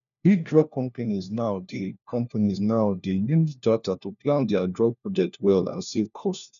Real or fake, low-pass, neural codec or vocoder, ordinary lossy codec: fake; 7.2 kHz; codec, 16 kHz, 1 kbps, FunCodec, trained on LibriTTS, 50 frames a second; none